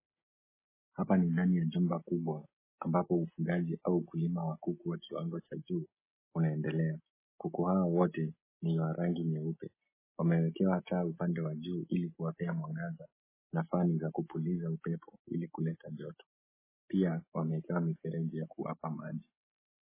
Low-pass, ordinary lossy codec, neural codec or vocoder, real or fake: 3.6 kHz; MP3, 16 kbps; none; real